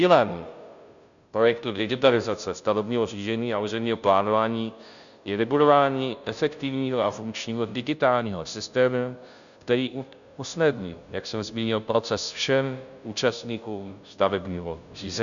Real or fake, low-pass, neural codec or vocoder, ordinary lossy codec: fake; 7.2 kHz; codec, 16 kHz, 0.5 kbps, FunCodec, trained on Chinese and English, 25 frames a second; MP3, 96 kbps